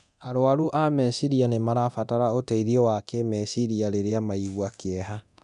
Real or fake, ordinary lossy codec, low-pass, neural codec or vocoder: fake; none; 10.8 kHz; codec, 24 kHz, 0.9 kbps, DualCodec